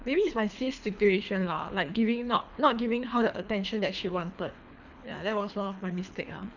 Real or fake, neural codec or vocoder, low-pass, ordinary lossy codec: fake; codec, 24 kHz, 3 kbps, HILCodec; 7.2 kHz; none